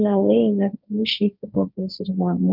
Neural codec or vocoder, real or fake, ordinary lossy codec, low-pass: codec, 16 kHz, 1.1 kbps, Voila-Tokenizer; fake; none; 5.4 kHz